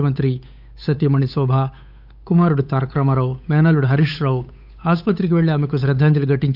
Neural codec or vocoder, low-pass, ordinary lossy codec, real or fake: codec, 16 kHz, 8 kbps, FunCodec, trained on Chinese and English, 25 frames a second; 5.4 kHz; none; fake